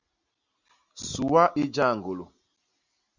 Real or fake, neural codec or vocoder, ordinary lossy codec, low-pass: real; none; Opus, 64 kbps; 7.2 kHz